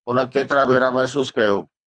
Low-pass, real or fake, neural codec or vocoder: 9.9 kHz; fake; codec, 24 kHz, 3 kbps, HILCodec